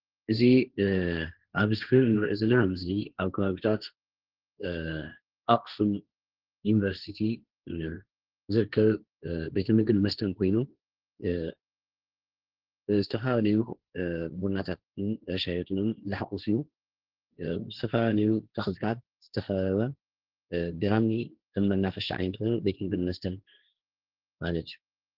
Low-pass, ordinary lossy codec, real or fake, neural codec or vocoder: 5.4 kHz; Opus, 16 kbps; fake; codec, 16 kHz, 1.1 kbps, Voila-Tokenizer